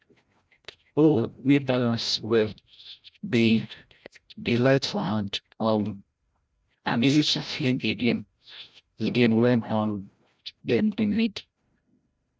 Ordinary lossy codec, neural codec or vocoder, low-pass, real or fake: none; codec, 16 kHz, 0.5 kbps, FreqCodec, larger model; none; fake